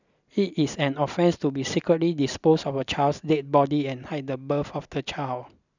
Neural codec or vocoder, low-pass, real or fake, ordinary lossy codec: none; 7.2 kHz; real; none